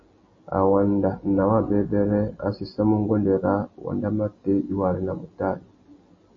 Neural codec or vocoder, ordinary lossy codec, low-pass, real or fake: none; MP3, 32 kbps; 7.2 kHz; real